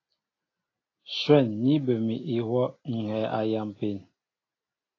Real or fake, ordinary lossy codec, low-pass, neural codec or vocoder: real; AAC, 32 kbps; 7.2 kHz; none